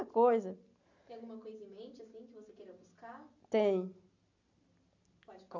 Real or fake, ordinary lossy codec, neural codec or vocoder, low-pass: real; none; none; 7.2 kHz